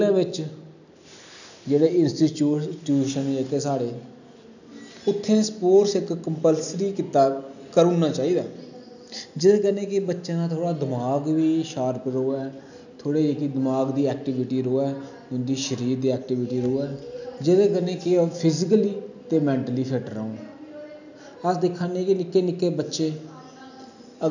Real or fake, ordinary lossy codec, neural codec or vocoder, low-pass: real; none; none; 7.2 kHz